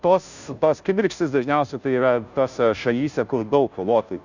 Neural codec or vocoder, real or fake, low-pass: codec, 16 kHz, 0.5 kbps, FunCodec, trained on Chinese and English, 25 frames a second; fake; 7.2 kHz